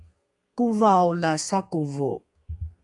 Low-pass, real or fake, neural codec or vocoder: 10.8 kHz; fake; codec, 32 kHz, 1.9 kbps, SNAC